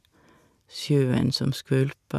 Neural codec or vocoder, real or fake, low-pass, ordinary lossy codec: none; real; 14.4 kHz; none